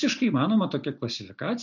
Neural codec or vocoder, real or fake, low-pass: none; real; 7.2 kHz